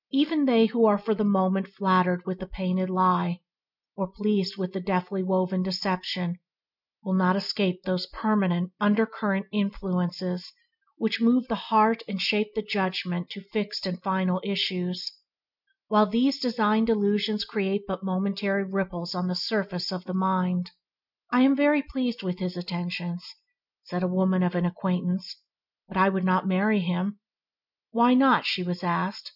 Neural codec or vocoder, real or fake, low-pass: none; real; 5.4 kHz